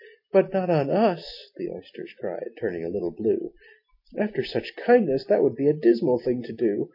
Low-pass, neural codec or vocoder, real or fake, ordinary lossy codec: 5.4 kHz; none; real; MP3, 32 kbps